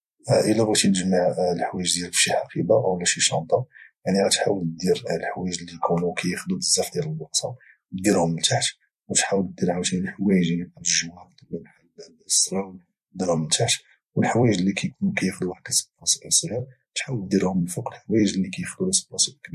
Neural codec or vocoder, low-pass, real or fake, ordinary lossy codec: vocoder, 44.1 kHz, 128 mel bands every 256 samples, BigVGAN v2; 14.4 kHz; fake; MP3, 64 kbps